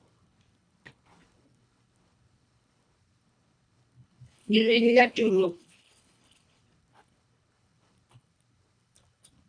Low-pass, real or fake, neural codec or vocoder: 9.9 kHz; fake; codec, 24 kHz, 1.5 kbps, HILCodec